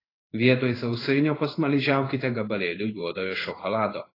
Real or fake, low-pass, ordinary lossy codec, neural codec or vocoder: fake; 5.4 kHz; AAC, 24 kbps; codec, 16 kHz in and 24 kHz out, 1 kbps, XY-Tokenizer